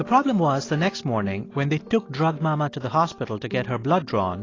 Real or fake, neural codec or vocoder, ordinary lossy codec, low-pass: real; none; AAC, 32 kbps; 7.2 kHz